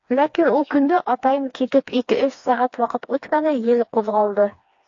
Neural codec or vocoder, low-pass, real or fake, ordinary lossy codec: codec, 16 kHz, 2 kbps, FreqCodec, smaller model; 7.2 kHz; fake; AAC, 48 kbps